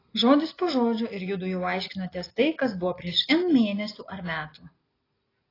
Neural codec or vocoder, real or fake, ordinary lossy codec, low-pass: none; real; AAC, 24 kbps; 5.4 kHz